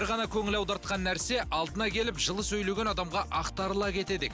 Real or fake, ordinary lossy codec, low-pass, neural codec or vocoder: real; none; none; none